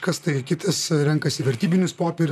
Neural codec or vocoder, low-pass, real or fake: vocoder, 44.1 kHz, 128 mel bands, Pupu-Vocoder; 14.4 kHz; fake